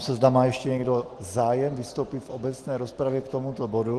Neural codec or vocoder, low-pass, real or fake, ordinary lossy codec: none; 10.8 kHz; real; Opus, 16 kbps